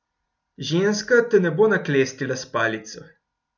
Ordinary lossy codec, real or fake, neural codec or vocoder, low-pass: none; real; none; 7.2 kHz